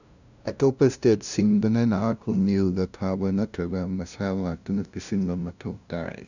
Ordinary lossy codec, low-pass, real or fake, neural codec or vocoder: none; 7.2 kHz; fake; codec, 16 kHz, 0.5 kbps, FunCodec, trained on LibriTTS, 25 frames a second